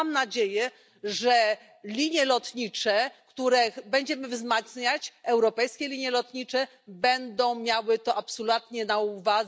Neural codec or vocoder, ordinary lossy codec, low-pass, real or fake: none; none; none; real